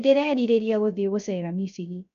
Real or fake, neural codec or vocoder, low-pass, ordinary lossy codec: fake; codec, 16 kHz, 0.3 kbps, FocalCodec; 7.2 kHz; none